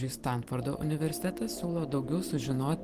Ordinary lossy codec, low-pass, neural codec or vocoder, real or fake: Opus, 16 kbps; 14.4 kHz; vocoder, 44.1 kHz, 128 mel bands every 512 samples, BigVGAN v2; fake